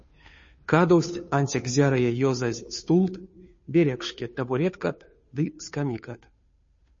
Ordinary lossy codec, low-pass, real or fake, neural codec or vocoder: MP3, 32 kbps; 7.2 kHz; fake; codec, 16 kHz, 2 kbps, FunCodec, trained on Chinese and English, 25 frames a second